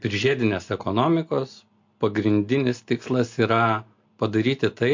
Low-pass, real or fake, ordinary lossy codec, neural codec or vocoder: 7.2 kHz; real; MP3, 48 kbps; none